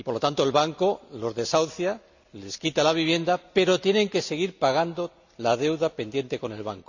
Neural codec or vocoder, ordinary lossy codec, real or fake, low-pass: none; none; real; 7.2 kHz